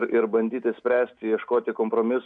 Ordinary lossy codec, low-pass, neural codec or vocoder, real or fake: MP3, 96 kbps; 9.9 kHz; none; real